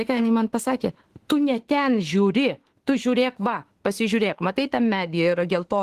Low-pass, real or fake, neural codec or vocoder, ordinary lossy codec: 14.4 kHz; fake; autoencoder, 48 kHz, 32 numbers a frame, DAC-VAE, trained on Japanese speech; Opus, 16 kbps